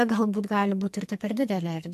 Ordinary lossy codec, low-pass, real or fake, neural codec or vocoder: MP3, 64 kbps; 14.4 kHz; fake; codec, 44.1 kHz, 2.6 kbps, SNAC